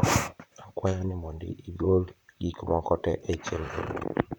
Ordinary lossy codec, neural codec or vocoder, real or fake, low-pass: none; vocoder, 44.1 kHz, 128 mel bands, Pupu-Vocoder; fake; none